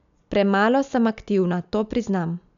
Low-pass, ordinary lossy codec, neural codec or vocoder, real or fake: 7.2 kHz; none; none; real